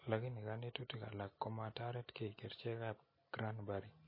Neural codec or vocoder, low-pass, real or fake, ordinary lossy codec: none; 5.4 kHz; real; MP3, 24 kbps